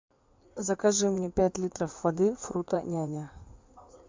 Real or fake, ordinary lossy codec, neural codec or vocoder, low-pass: fake; MP3, 64 kbps; codec, 16 kHz in and 24 kHz out, 2.2 kbps, FireRedTTS-2 codec; 7.2 kHz